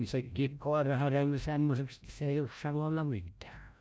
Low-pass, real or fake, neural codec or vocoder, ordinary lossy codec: none; fake; codec, 16 kHz, 0.5 kbps, FreqCodec, larger model; none